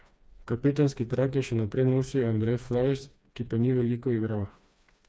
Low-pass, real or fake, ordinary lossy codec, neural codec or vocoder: none; fake; none; codec, 16 kHz, 2 kbps, FreqCodec, smaller model